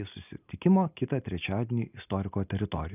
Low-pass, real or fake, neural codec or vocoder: 3.6 kHz; real; none